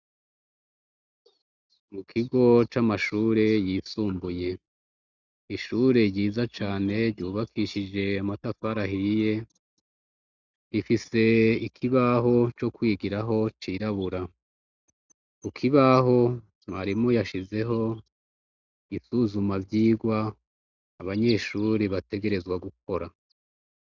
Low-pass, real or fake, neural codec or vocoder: 7.2 kHz; real; none